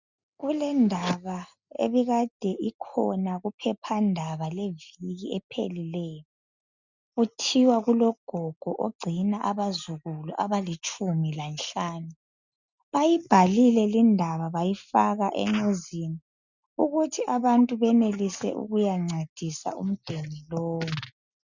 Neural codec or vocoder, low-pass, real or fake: none; 7.2 kHz; real